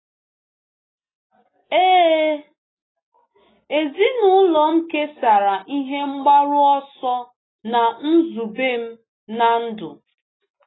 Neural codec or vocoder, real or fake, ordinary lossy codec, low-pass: none; real; AAC, 16 kbps; 7.2 kHz